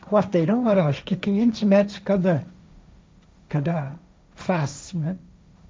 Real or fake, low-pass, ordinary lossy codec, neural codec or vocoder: fake; none; none; codec, 16 kHz, 1.1 kbps, Voila-Tokenizer